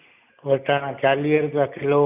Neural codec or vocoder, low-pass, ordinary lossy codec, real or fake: none; 3.6 kHz; none; real